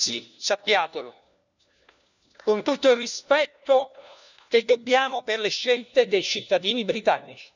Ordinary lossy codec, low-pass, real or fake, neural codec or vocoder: none; 7.2 kHz; fake; codec, 16 kHz, 1 kbps, FunCodec, trained on LibriTTS, 50 frames a second